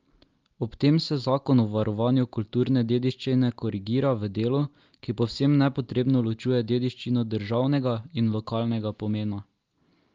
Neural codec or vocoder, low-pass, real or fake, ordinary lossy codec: none; 7.2 kHz; real; Opus, 32 kbps